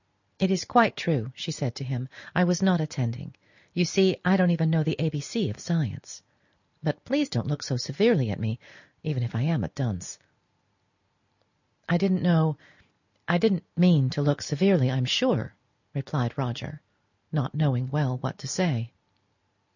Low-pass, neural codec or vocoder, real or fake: 7.2 kHz; none; real